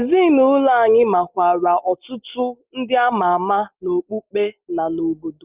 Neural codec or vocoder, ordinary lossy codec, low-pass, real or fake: none; Opus, 24 kbps; 3.6 kHz; real